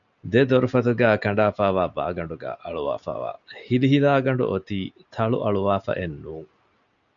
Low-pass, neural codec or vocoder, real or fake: 7.2 kHz; none; real